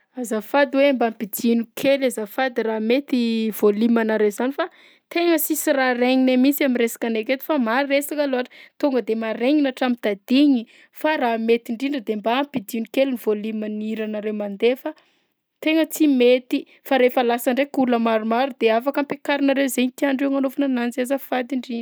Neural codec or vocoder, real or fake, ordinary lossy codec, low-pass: none; real; none; none